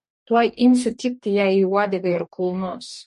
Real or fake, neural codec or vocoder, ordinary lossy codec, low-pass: fake; codec, 44.1 kHz, 2.6 kbps, DAC; MP3, 48 kbps; 14.4 kHz